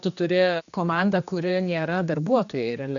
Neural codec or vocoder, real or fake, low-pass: codec, 16 kHz, 2 kbps, X-Codec, HuBERT features, trained on general audio; fake; 7.2 kHz